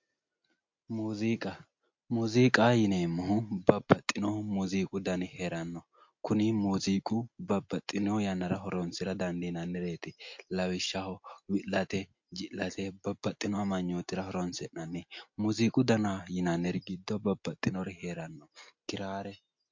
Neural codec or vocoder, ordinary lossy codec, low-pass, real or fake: none; MP3, 48 kbps; 7.2 kHz; real